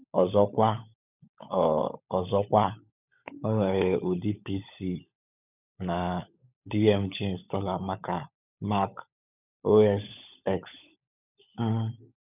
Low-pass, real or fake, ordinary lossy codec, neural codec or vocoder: 3.6 kHz; fake; none; codec, 16 kHz, 16 kbps, FunCodec, trained on LibriTTS, 50 frames a second